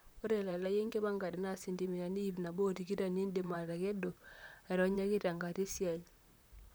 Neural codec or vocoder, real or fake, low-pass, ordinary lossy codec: vocoder, 44.1 kHz, 128 mel bands, Pupu-Vocoder; fake; none; none